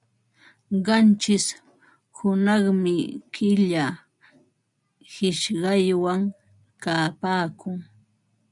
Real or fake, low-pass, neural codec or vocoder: real; 10.8 kHz; none